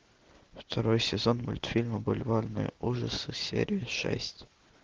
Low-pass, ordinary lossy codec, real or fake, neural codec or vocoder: 7.2 kHz; Opus, 16 kbps; real; none